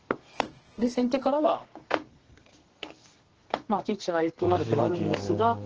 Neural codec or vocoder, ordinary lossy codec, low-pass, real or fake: codec, 32 kHz, 1.9 kbps, SNAC; Opus, 16 kbps; 7.2 kHz; fake